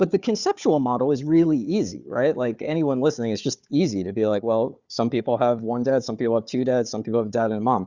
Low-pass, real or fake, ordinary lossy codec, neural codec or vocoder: 7.2 kHz; fake; Opus, 64 kbps; codec, 16 kHz, 4 kbps, FunCodec, trained on Chinese and English, 50 frames a second